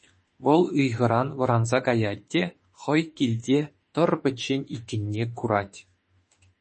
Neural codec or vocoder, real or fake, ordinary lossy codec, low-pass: autoencoder, 48 kHz, 32 numbers a frame, DAC-VAE, trained on Japanese speech; fake; MP3, 32 kbps; 10.8 kHz